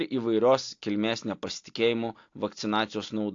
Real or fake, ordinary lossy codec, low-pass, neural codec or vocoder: real; AAC, 48 kbps; 7.2 kHz; none